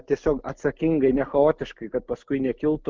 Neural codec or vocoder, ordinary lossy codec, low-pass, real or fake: none; Opus, 32 kbps; 7.2 kHz; real